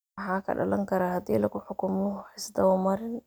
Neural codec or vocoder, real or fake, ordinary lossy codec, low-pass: none; real; none; none